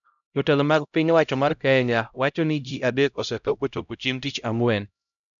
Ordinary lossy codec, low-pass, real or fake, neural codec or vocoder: none; 7.2 kHz; fake; codec, 16 kHz, 0.5 kbps, X-Codec, HuBERT features, trained on LibriSpeech